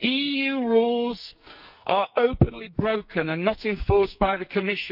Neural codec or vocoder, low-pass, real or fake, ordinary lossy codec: codec, 44.1 kHz, 2.6 kbps, SNAC; 5.4 kHz; fake; none